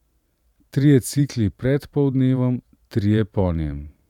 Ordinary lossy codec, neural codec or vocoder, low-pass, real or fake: none; vocoder, 44.1 kHz, 128 mel bands every 512 samples, BigVGAN v2; 19.8 kHz; fake